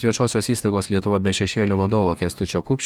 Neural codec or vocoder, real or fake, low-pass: codec, 44.1 kHz, 2.6 kbps, DAC; fake; 19.8 kHz